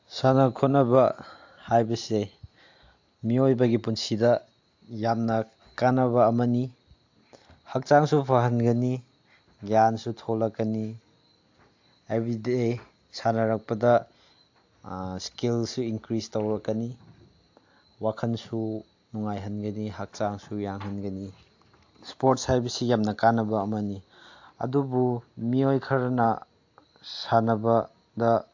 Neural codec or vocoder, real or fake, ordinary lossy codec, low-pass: none; real; AAC, 48 kbps; 7.2 kHz